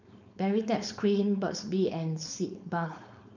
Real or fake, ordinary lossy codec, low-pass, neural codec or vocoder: fake; none; 7.2 kHz; codec, 16 kHz, 4.8 kbps, FACodec